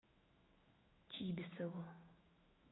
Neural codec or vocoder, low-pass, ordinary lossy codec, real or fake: autoencoder, 48 kHz, 128 numbers a frame, DAC-VAE, trained on Japanese speech; 7.2 kHz; AAC, 16 kbps; fake